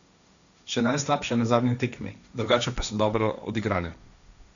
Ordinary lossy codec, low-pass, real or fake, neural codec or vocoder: none; 7.2 kHz; fake; codec, 16 kHz, 1.1 kbps, Voila-Tokenizer